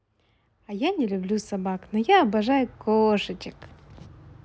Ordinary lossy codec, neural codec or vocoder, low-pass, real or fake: none; none; none; real